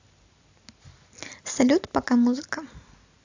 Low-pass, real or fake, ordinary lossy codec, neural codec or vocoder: 7.2 kHz; real; AAC, 48 kbps; none